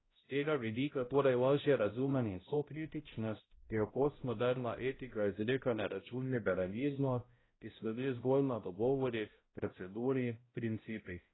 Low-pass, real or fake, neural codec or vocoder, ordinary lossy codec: 7.2 kHz; fake; codec, 16 kHz, 0.5 kbps, X-Codec, HuBERT features, trained on balanced general audio; AAC, 16 kbps